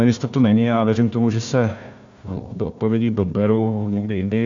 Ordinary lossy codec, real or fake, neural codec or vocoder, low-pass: AAC, 64 kbps; fake; codec, 16 kHz, 1 kbps, FunCodec, trained on Chinese and English, 50 frames a second; 7.2 kHz